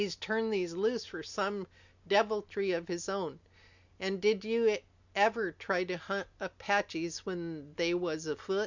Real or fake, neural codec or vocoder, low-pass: real; none; 7.2 kHz